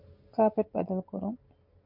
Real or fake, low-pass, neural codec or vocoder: real; 5.4 kHz; none